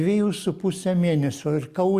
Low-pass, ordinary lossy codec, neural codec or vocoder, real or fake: 14.4 kHz; Opus, 64 kbps; none; real